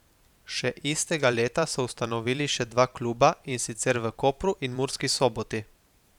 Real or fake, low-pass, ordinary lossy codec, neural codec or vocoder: fake; 19.8 kHz; none; vocoder, 44.1 kHz, 128 mel bands every 512 samples, BigVGAN v2